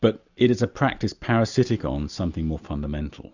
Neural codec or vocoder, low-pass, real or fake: none; 7.2 kHz; real